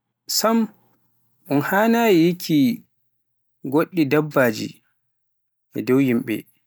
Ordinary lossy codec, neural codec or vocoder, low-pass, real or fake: none; none; none; real